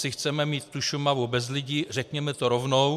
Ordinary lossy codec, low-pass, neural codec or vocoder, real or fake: MP3, 96 kbps; 14.4 kHz; none; real